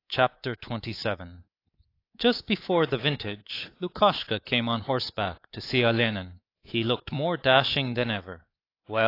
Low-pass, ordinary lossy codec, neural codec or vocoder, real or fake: 5.4 kHz; AAC, 32 kbps; codec, 24 kHz, 3.1 kbps, DualCodec; fake